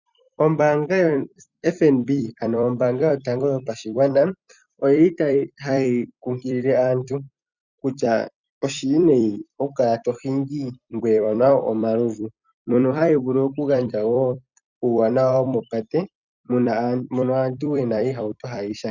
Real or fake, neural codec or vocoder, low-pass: fake; vocoder, 44.1 kHz, 128 mel bands every 256 samples, BigVGAN v2; 7.2 kHz